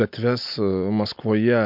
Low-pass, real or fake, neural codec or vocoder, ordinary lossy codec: 5.4 kHz; real; none; MP3, 48 kbps